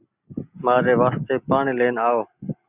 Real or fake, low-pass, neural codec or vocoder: real; 3.6 kHz; none